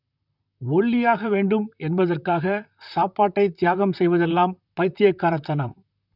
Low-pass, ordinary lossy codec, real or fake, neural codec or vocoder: 5.4 kHz; none; fake; vocoder, 44.1 kHz, 128 mel bands, Pupu-Vocoder